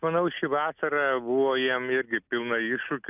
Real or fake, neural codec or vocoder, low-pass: real; none; 3.6 kHz